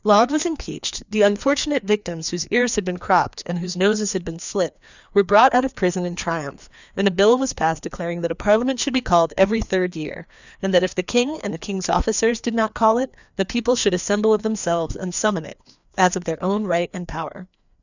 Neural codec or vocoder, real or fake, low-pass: codec, 16 kHz, 2 kbps, FreqCodec, larger model; fake; 7.2 kHz